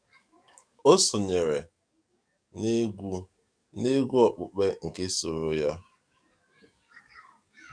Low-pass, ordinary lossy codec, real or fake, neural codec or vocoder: 9.9 kHz; none; fake; codec, 44.1 kHz, 7.8 kbps, DAC